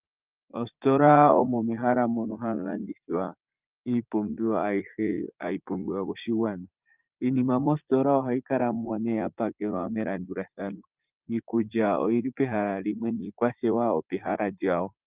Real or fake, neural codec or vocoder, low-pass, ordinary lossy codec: fake; vocoder, 44.1 kHz, 80 mel bands, Vocos; 3.6 kHz; Opus, 24 kbps